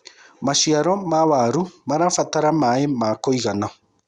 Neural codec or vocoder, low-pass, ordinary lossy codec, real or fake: none; 10.8 kHz; Opus, 64 kbps; real